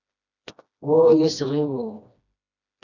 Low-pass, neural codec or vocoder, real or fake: 7.2 kHz; codec, 16 kHz, 1 kbps, FreqCodec, smaller model; fake